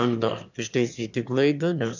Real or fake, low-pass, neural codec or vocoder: fake; 7.2 kHz; autoencoder, 22.05 kHz, a latent of 192 numbers a frame, VITS, trained on one speaker